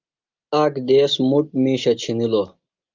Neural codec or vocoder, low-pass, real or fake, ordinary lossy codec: none; 7.2 kHz; real; Opus, 32 kbps